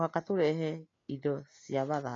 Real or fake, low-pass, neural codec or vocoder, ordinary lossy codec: real; 7.2 kHz; none; AAC, 32 kbps